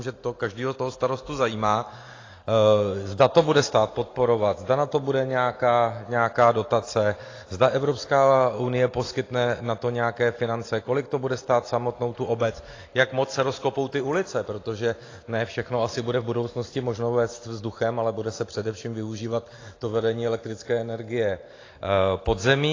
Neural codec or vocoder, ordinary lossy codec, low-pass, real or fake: none; AAC, 32 kbps; 7.2 kHz; real